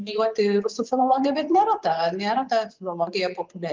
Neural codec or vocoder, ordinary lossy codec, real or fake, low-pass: vocoder, 24 kHz, 100 mel bands, Vocos; Opus, 32 kbps; fake; 7.2 kHz